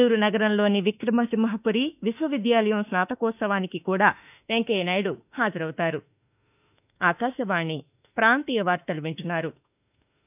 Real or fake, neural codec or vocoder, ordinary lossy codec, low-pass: fake; autoencoder, 48 kHz, 32 numbers a frame, DAC-VAE, trained on Japanese speech; none; 3.6 kHz